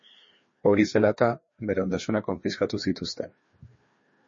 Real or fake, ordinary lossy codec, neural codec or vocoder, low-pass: fake; MP3, 32 kbps; codec, 16 kHz, 2 kbps, FreqCodec, larger model; 7.2 kHz